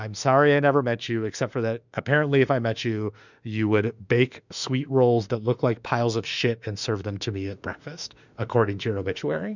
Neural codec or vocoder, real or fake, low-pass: autoencoder, 48 kHz, 32 numbers a frame, DAC-VAE, trained on Japanese speech; fake; 7.2 kHz